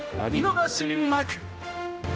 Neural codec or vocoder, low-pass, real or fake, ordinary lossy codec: codec, 16 kHz, 0.5 kbps, X-Codec, HuBERT features, trained on general audio; none; fake; none